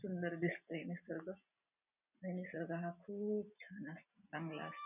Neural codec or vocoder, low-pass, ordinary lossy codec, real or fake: none; 3.6 kHz; none; real